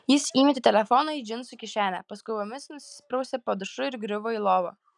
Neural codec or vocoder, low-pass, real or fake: none; 10.8 kHz; real